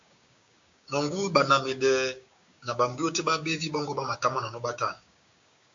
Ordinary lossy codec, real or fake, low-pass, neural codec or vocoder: AAC, 64 kbps; fake; 7.2 kHz; codec, 16 kHz, 6 kbps, DAC